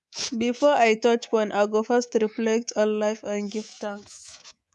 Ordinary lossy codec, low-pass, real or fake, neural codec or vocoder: none; none; fake; codec, 24 kHz, 3.1 kbps, DualCodec